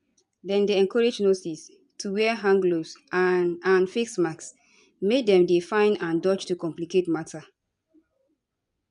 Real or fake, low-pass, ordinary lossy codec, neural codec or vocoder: fake; 10.8 kHz; none; vocoder, 24 kHz, 100 mel bands, Vocos